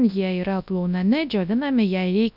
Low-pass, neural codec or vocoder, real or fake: 5.4 kHz; codec, 24 kHz, 0.9 kbps, WavTokenizer, large speech release; fake